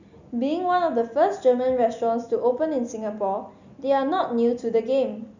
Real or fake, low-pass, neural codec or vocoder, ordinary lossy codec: real; 7.2 kHz; none; none